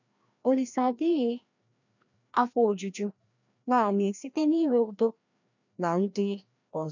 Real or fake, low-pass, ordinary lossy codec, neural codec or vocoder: fake; 7.2 kHz; none; codec, 16 kHz, 1 kbps, FreqCodec, larger model